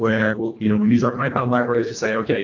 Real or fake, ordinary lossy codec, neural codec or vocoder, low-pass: fake; AAC, 48 kbps; codec, 24 kHz, 1.5 kbps, HILCodec; 7.2 kHz